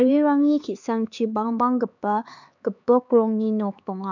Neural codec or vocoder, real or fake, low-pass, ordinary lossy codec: codec, 16 kHz, 4 kbps, X-Codec, HuBERT features, trained on LibriSpeech; fake; 7.2 kHz; none